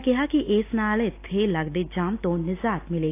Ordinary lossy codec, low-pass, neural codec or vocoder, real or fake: AAC, 24 kbps; 3.6 kHz; none; real